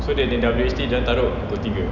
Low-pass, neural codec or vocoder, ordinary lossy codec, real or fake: 7.2 kHz; none; none; real